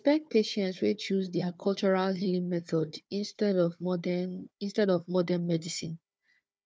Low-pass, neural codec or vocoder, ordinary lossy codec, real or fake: none; codec, 16 kHz, 4 kbps, FunCodec, trained on Chinese and English, 50 frames a second; none; fake